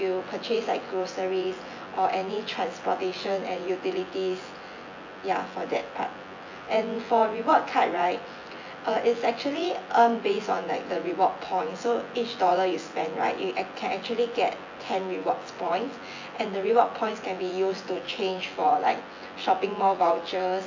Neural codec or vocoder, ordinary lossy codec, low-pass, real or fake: vocoder, 24 kHz, 100 mel bands, Vocos; AAC, 48 kbps; 7.2 kHz; fake